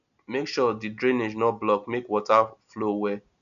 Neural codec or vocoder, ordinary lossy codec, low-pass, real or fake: none; none; 7.2 kHz; real